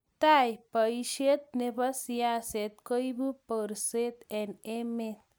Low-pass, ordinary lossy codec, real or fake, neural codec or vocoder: none; none; real; none